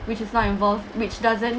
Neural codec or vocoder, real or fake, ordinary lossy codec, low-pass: none; real; none; none